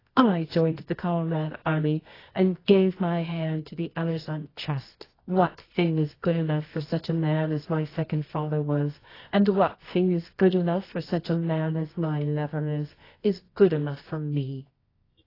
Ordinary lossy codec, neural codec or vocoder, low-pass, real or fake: AAC, 24 kbps; codec, 24 kHz, 0.9 kbps, WavTokenizer, medium music audio release; 5.4 kHz; fake